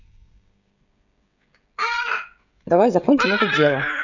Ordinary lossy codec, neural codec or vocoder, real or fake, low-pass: none; codec, 16 kHz, 16 kbps, FreqCodec, smaller model; fake; 7.2 kHz